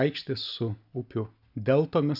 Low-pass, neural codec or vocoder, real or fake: 5.4 kHz; none; real